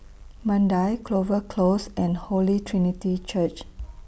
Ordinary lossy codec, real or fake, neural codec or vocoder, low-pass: none; real; none; none